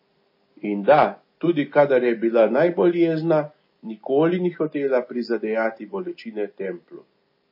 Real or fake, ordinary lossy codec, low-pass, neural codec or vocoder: real; MP3, 24 kbps; 5.4 kHz; none